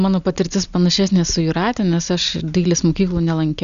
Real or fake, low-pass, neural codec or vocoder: real; 7.2 kHz; none